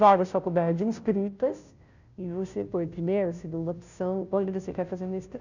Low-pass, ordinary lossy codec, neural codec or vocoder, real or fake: 7.2 kHz; none; codec, 16 kHz, 0.5 kbps, FunCodec, trained on Chinese and English, 25 frames a second; fake